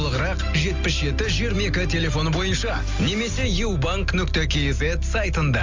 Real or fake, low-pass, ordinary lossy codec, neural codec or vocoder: real; 7.2 kHz; Opus, 32 kbps; none